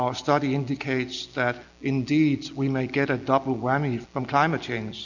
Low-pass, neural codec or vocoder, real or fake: 7.2 kHz; none; real